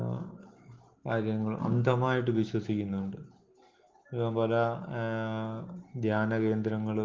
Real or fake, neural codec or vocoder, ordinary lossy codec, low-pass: real; none; Opus, 16 kbps; 7.2 kHz